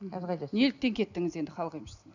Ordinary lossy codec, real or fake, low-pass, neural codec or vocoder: none; real; 7.2 kHz; none